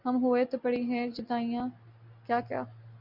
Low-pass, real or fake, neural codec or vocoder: 5.4 kHz; real; none